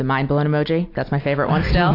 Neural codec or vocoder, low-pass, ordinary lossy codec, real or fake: none; 5.4 kHz; AAC, 32 kbps; real